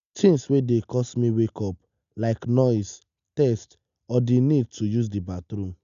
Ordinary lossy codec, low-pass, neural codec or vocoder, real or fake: none; 7.2 kHz; none; real